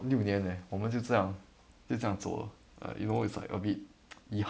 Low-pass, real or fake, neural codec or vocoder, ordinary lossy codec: none; real; none; none